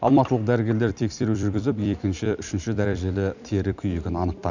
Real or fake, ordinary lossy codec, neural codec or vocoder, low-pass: fake; none; vocoder, 44.1 kHz, 128 mel bands every 256 samples, BigVGAN v2; 7.2 kHz